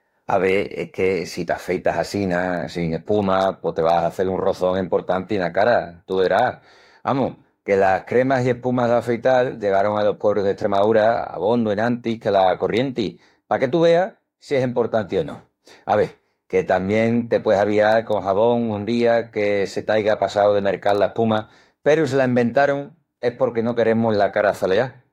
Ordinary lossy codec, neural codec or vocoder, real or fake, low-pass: AAC, 48 kbps; autoencoder, 48 kHz, 32 numbers a frame, DAC-VAE, trained on Japanese speech; fake; 19.8 kHz